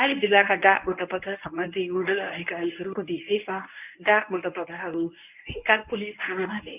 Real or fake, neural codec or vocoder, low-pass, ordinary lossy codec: fake; codec, 24 kHz, 0.9 kbps, WavTokenizer, medium speech release version 1; 3.6 kHz; none